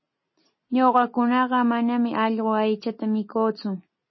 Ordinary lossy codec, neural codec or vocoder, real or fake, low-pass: MP3, 24 kbps; none; real; 7.2 kHz